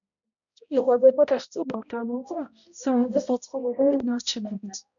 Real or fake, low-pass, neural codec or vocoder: fake; 7.2 kHz; codec, 16 kHz, 0.5 kbps, X-Codec, HuBERT features, trained on balanced general audio